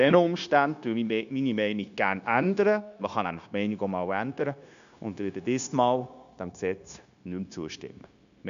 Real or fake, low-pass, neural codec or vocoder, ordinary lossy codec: fake; 7.2 kHz; codec, 16 kHz, 0.9 kbps, LongCat-Audio-Codec; none